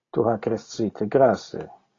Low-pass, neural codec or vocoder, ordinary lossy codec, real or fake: 7.2 kHz; none; AAC, 32 kbps; real